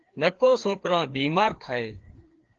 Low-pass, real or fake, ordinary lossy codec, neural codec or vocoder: 7.2 kHz; fake; Opus, 16 kbps; codec, 16 kHz, 2 kbps, FreqCodec, larger model